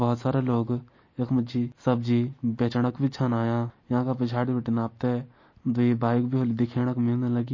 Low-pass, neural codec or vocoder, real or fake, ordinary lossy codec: 7.2 kHz; none; real; MP3, 32 kbps